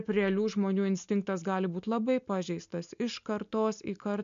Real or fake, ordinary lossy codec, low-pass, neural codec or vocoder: real; MP3, 64 kbps; 7.2 kHz; none